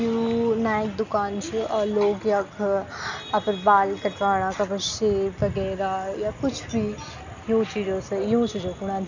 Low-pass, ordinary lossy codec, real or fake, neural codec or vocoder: 7.2 kHz; none; real; none